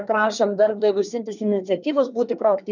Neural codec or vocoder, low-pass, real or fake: codec, 24 kHz, 1 kbps, SNAC; 7.2 kHz; fake